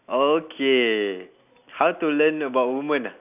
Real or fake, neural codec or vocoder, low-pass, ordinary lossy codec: real; none; 3.6 kHz; none